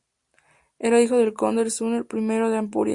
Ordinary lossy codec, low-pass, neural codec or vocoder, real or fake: Opus, 64 kbps; 10.8 kHz; none; real